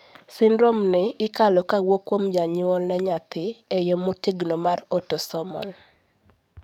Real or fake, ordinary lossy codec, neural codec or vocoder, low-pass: fake; none; codec, 44.1 kHz, 7.8 kbps, DAC; 19.8 kHz